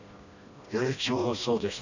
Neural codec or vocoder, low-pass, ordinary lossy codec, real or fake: codec, 16 kHz, 1 kbps, FreqCodec, smaller model; 7.2 kHz; none; fake